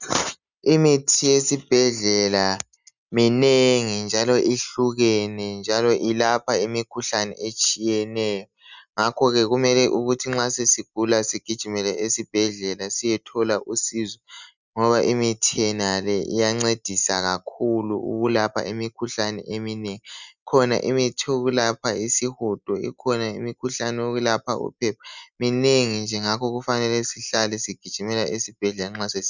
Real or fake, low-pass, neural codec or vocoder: real; 7.2 kHz; none